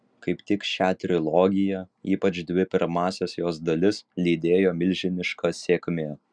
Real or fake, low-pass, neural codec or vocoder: real; 9.9 kHz; none